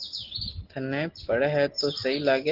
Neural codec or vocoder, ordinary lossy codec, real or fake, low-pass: none; Opus, 16 kbps; real; 5.4 kHz